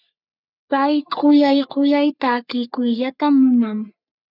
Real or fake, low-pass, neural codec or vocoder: fake; 5.4 kHz; codec, 44.1 kHz, 3.4 kbps, Pupu-Codec